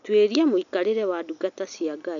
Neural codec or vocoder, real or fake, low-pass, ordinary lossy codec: none; real; 7.2 kHz; none